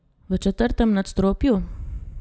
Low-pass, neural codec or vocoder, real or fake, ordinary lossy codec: none; none; real; none